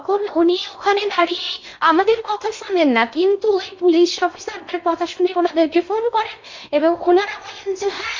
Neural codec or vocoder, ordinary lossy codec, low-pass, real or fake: codec, 16 kHz in and 24 kHz out, 0.6 kbps, FocalCodec, streaming, 4096 codes; MP3, 48 kbps; 7.2 kHz; fake